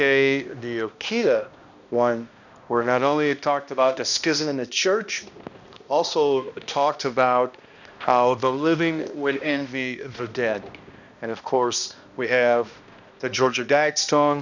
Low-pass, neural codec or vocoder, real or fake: 7.2 kHz; codec, 16 kHz, 1 kbps, X-Codec, HuBERT features, trained on balanced general audio; fake